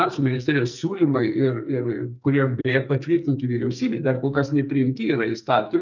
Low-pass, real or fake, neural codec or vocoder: 7.2 kHz; fake; codec, 44.1 kHz, 2.6 kbps, SNAC